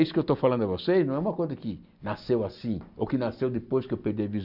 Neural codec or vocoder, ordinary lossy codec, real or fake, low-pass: none; none; real; 5.4 kHz